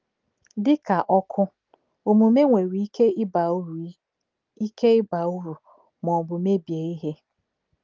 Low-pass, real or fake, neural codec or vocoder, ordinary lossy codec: 7.2 kHz; real; none; Opus, 32 kbps